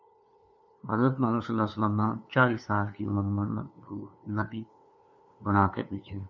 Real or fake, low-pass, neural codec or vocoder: fake; 7.2 kHz; codec, 16 kHz, 2 kbps, FunCodec, trained on LibriTTS, 25 frames a second